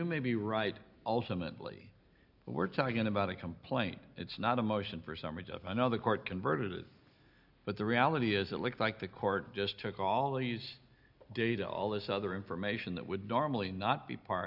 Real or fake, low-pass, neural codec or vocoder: real; 5.4 kHz; none